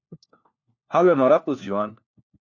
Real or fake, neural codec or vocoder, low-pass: fake; codec, 16 kHz, 1 kbps, FunCodec, trained on LibriTTS, 50 frames a second; 7.2 kHz